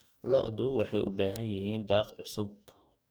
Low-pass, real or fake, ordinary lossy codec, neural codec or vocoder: none; fake; none; codec, 44.1 kHz, 2.6 kbps, DAC